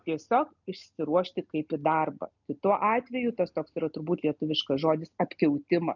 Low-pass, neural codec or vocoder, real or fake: 7.2 kHz; none; real